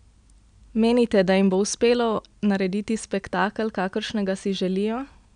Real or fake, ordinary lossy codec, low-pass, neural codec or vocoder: real; none; 9.9 kHz; none